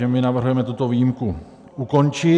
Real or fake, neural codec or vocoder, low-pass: fake; vocoder, 44.1 kHz, 128 mel bands every 256 samples, BigVGAN v2; 9.9 kHz